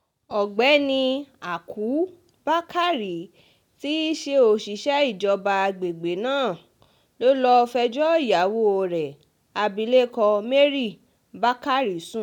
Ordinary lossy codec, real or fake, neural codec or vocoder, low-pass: none; real; none; 19.8 kHz